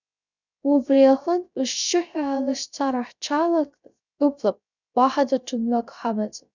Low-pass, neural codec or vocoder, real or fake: 7.2 kHz; codec, 16 kHz, 0.3 kbps, FocalCodec; fake